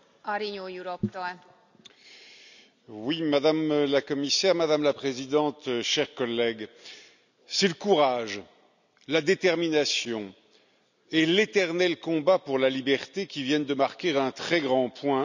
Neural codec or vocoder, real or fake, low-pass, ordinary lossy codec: none; real; 7.2 kHz; none